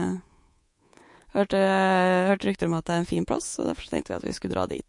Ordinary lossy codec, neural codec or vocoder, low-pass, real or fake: MP3, 48 kbps; codec, 24 kHz, 3.1 kbps, DualCodec; 10.8 kHz; fake